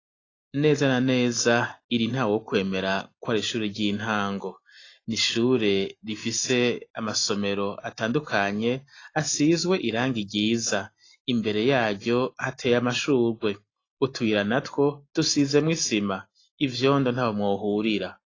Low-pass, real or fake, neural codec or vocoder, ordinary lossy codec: 7.2 kHz; real; none; AAC, 32 kbps